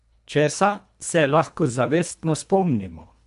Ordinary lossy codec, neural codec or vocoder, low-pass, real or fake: AAC, 96 kbps; codec, 24 kHz, 1.5 kbps, HILCodec; 10.8 kHz; fake